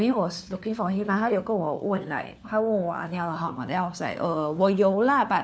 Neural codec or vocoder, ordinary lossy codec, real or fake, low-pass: codec, 16 kHz, 4 kbps, FunCodec, trained on LibriTTS, 50 frames a second; none; fake; none